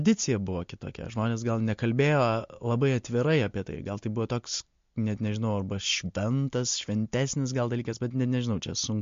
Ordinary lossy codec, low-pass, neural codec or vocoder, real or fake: MP3, 48 kbps; 7.2 kHz; none; real